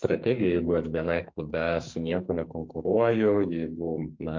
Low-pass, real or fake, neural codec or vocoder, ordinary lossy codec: 7.2 kHz; fake; codec, 44.1 kHz, 2.6 kbps, SNAC; MP3, 48 kbps